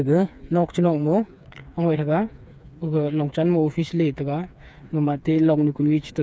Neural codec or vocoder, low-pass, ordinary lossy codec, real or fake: codec, 16 kHz, 4 kbps, FreqCodec, smaller model; none; none; fake